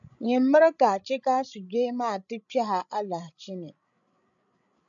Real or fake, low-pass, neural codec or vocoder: fake; 7.2 kHz; codec, 16 kHz, 16 kbps, FreqCodec, larger model